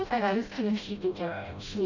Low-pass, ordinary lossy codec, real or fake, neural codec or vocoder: 7.2 kHz; none; fake; codec, 16 kHz, 0.5 kbps, FreqCodec, smaller model